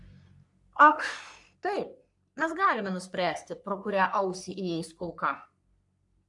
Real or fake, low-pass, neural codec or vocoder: fake; 10.8 kHz; codec, 44.1 kHz, 3.4 kbps, Pupu-Codec